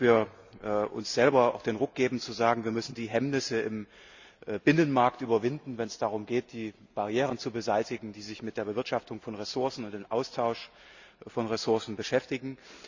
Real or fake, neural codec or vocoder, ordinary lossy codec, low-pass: real; none; Opus, 64 kbps; 7.2 kHz